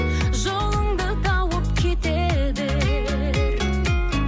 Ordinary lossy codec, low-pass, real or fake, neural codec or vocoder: none; none; real; none